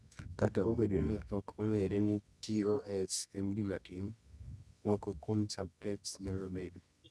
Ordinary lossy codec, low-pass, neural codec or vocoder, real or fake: none; none; codec, 24 kHz, 0.9 kbps, WavTokenizer, medium music audio release; fake